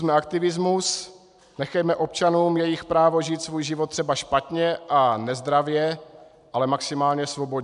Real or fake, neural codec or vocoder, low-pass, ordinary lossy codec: real; none; 10.8 kHz; MP3, 96 kbps